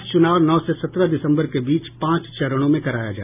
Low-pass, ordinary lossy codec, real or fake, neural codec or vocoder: 3.6 kHz; none; real; none